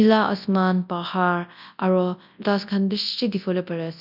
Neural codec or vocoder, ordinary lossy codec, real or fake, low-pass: codec, 24 kHz, 0.9 kbps, WavTokenizer, large speech release; none; fake; 5.4 kHz